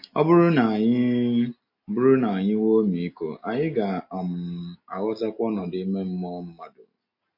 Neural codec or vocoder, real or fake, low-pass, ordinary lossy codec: none; real; 5.4 kHz; MP3, 32 kbps